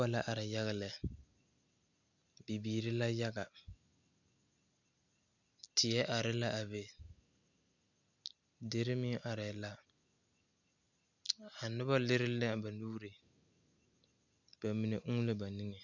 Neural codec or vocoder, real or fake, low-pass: none; real; 7.2 kHz